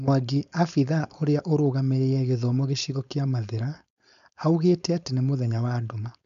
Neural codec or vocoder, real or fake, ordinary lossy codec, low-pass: codec, 16 kHz, 4.8 kbps, FACodec; fake; AAC, 96 kbps; 7.2 kHz